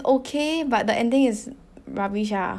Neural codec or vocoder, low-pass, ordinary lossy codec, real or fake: none; none; none; real